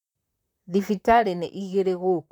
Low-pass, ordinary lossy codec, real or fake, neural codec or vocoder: 19.8 kHz; none; fake; vocoder, 44.1 kHz, 128 mel bands, Pupu-Vocoder